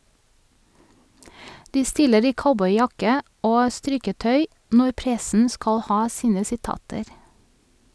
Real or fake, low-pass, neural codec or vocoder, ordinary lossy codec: real; none; none; none